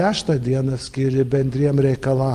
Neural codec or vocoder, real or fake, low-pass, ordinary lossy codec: none; real; 14.4 kHz; Opus, 32 kbps